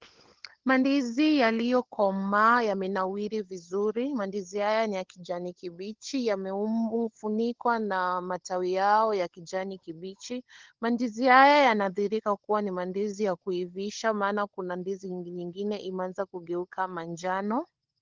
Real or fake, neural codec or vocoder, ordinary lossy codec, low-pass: fake; codec, 16 kHz, 16 kbps, FunCodec, trained on LibriTTS, 50 frames a second; Opus, 16 kbps; 7.2 kHz